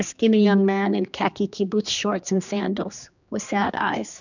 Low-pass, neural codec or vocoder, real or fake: 7.2 kHz; codec, 16 kHz, 2 kbps, X-Codec, HuBERT features, trained on general audio; fake